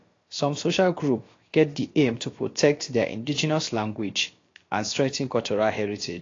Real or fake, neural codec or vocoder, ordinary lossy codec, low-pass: fake; codec, 16 kHz, about 1 kbps, DyCAST, with the encoder's durations; AAC, 32 kbps; 7.2 kHz